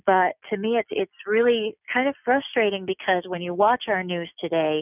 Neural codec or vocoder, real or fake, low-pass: none; real; 3.6 kHz